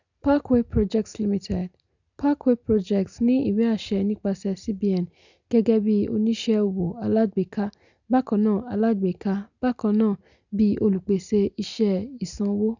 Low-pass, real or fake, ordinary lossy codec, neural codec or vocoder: 7.2 kHz; real; none; none